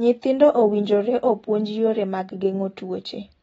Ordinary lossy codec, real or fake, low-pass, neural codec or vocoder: AAC, 24 kbps; real; 19.8 kHz; none